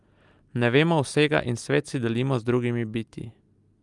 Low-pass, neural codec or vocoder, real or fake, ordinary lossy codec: 10.8 kHz; none; real; Opus, 32 kbps